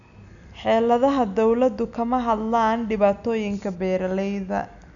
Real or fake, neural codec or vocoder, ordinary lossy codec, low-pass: real; none; none; 7.2 kHz